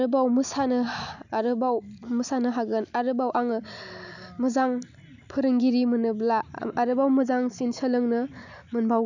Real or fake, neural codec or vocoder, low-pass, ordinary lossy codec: real; none; 7.2 kHz; none